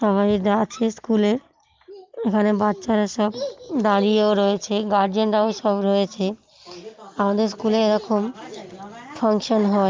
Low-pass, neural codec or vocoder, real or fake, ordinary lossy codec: 7.2 kHz; none; real; Opus, 24 kbps